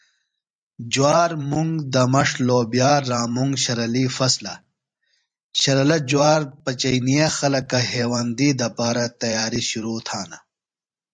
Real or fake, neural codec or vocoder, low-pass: fake; vocoder, 44.1 kHz, 128 mel bands every 512 samples, BigVGAN v2; 9.9 kHz